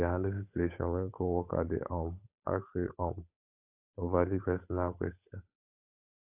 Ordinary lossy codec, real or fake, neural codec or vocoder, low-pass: AAC, 32 kbps; fake; codec, 16 kHz, 8 kbps, FunCodec, trained on Chinese and English, 25 frames a second; 3.6 kHz